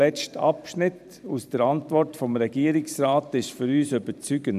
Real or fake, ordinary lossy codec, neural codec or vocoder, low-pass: fake; none; vocoder, 44.1 kHz, 128 mel bands every 512 samples, BigVGAN v2; 14.4 kHz